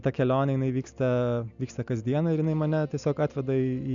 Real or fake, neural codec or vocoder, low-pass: real; none; 7.2 kHz